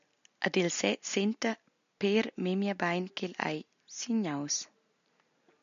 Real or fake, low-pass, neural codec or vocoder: real; 7.2 kHz; none